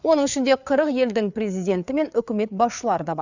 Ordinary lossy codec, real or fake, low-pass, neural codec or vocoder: none; fake; 7.2 kHz; codec, 16 kHz, 4 kbps, FreqCodec, larger model